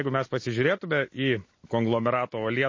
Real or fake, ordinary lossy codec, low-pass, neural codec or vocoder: real; MP3, 32 kbps; 7.2 kHz; none